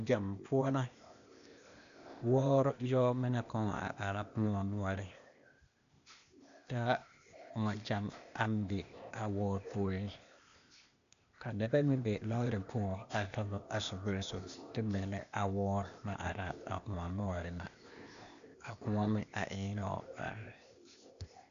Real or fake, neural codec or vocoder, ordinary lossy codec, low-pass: fake; codec, 16 kHz, 0.8 kbps, ZipCodec; MP3, 96 kbps; 7.2 kHz